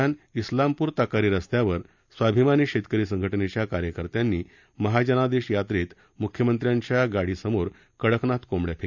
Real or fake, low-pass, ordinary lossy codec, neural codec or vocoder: real; 7.2 kHz; none; none